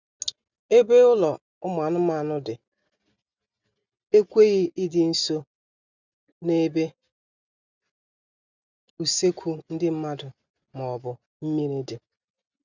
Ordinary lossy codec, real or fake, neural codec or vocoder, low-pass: none; real; none; 7.2 kHz